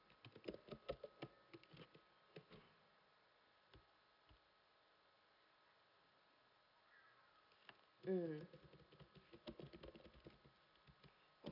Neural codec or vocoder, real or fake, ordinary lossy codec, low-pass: none; real; none; 5.4 kHz